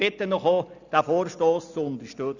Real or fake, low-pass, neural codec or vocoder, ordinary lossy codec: real; 7.2 kHz; none; none